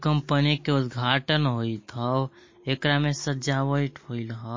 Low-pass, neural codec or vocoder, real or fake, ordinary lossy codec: 7.2 kHz; none; real; MP3, 32 kbps